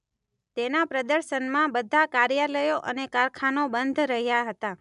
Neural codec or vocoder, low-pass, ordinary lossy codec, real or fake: none; 10.8 kHz; none; real